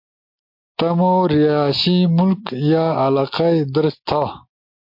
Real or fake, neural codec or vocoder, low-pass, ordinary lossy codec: real; none; 5.4 kHz; MP3, 32 kbps